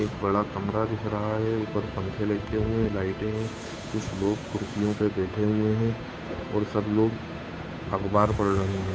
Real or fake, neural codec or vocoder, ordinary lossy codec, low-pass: fake; codec, 16 kHz, 8 kbps, FunCodec, trained on Chinese and English, 25 frames a second; none; none